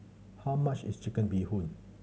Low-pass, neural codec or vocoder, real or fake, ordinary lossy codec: none; none; real; none